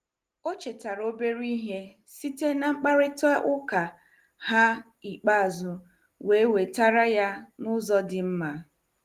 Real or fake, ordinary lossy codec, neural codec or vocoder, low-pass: real; Opus, 24 kbps; none; 14.4 kHz